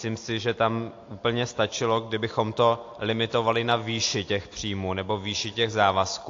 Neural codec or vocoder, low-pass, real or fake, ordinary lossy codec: none; 7.2 kHz; real; AAC, 48 kbps